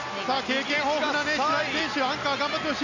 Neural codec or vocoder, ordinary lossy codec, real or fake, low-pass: none; none; real; 7.2 kHz